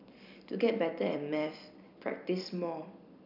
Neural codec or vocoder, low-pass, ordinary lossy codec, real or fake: vocoder, 44.1 kHz, 128 mel bands every 256 samples, BigVGAN v2; 5.4 kHz; none; fake